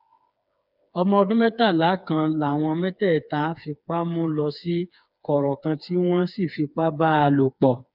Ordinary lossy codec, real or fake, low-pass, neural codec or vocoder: none; fake; 5.4 kHz; codec, 16 kHz, 4 kbps, FreqCodec, smaller model